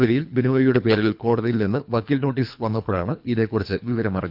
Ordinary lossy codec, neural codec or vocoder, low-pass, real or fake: none; codec, 24 kHz, 3 kbps, HILCodec; 5.4 kHz; fake